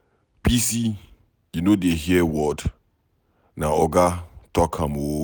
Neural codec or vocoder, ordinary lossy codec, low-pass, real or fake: none; none; none; real